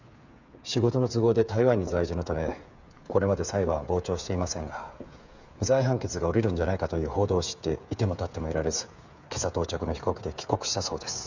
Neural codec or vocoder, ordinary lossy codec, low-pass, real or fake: codec, 16 kHz, 8 kbps, FreqCodec, smaller model; none; 7.2 kHz; fake